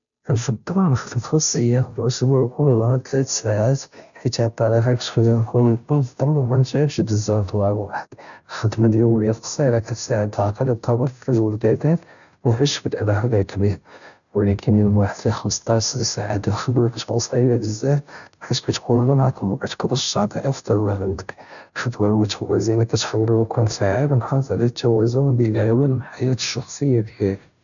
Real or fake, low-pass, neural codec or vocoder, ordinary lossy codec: fake; 7.2 kHz; codec, 16 kHz, 0.5 kbps, FunCodec, trained on Chinese and English, 25 frames a second; none